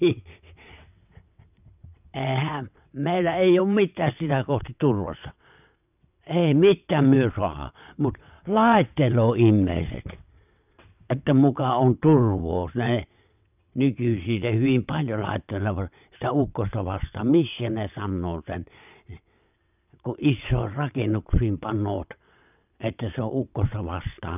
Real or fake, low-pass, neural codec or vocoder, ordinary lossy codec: fake; 3.6 kHz; vocoder, 22.05 kHz, 80 mel bands, WaveNeXt; none